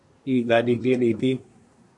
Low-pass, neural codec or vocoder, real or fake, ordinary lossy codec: 10.8 kHz; codec, 24 kHz, 1 kbps, SNAC; fake; MP3, 48 kbps